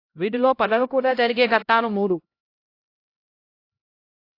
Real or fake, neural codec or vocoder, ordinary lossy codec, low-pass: fake; codec, 16 kHz, 0.5 kbps, X-Codec, HuBERT features, trained on LibriSpeech; AAC, 32 kbps; 5.4 kHz